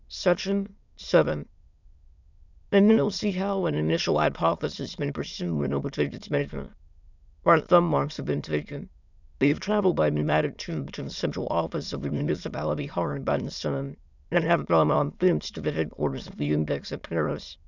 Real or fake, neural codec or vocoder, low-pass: fake; autoencoder, 22.05 kHz, a latent of 192 numbers a frame, VITS, trained on many speakers; 7.2 kHz